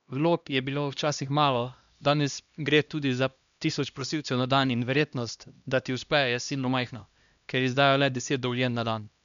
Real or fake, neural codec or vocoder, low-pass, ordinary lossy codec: fake; codec, 16 kHz, 1 kbps, X-Codec, HuBERT features, trained on LibriSpeech; 7.2 kHz; MP3, 96 kbps